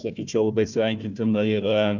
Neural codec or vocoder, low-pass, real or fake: codec, 16 kHz, 1 kbps, FunCodec, trained on Chinese and English, 50 frames a second; 7.2 kHz; fake